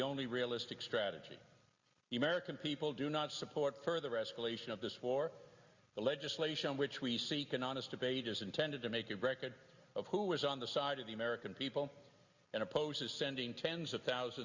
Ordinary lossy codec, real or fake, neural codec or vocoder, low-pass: Opus, 64 kbps; real; none; 7.2 kHz